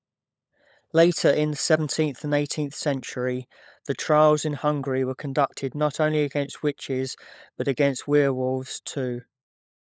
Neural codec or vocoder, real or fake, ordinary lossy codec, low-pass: codec, 16 kHz, 16 kbps, FunCodec, trained on LibriTTS, 50 frames a second; fake; none; none